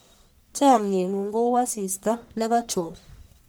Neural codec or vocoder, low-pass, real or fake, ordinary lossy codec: codec, 44.1 kHz, 1.7 kbps, Pupu-Codec; none; fake; none